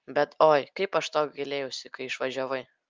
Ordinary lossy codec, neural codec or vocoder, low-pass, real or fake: Opus, 32 kbps; none; 7.2 kHz; real